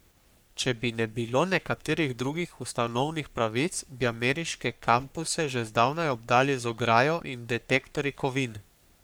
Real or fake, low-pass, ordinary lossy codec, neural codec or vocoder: fake; none; none; codec, 44.1 kHz, 3.4 kbps, Pupu-Codec